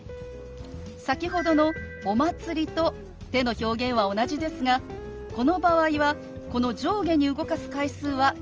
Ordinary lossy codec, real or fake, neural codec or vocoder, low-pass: Opus, 24 kbps; real; none; 7.2 kHz